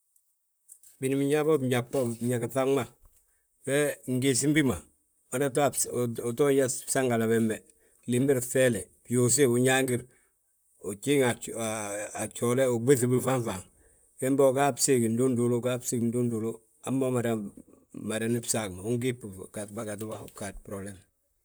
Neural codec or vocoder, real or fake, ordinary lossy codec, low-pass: vocoder, 44.1 kHz, 128 mel bands, Pupu-Vocoder; fake; none; none